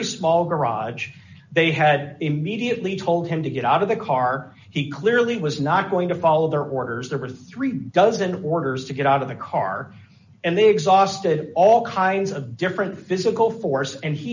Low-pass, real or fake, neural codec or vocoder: 7.2 kHz; real; none